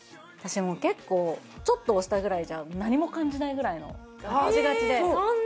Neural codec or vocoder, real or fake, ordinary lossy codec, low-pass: none; real; none; none